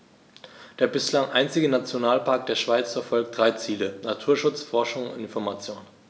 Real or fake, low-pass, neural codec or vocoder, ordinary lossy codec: real; none; none; none